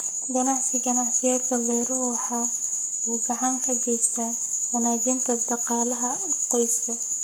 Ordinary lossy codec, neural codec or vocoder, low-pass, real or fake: none; codec, 44.1 kHz, 7.8 kbps, Pupu-Codec; none; fake